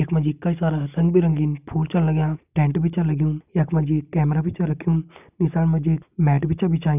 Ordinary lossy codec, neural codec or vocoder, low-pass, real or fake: none; none; 3.6 kHz; real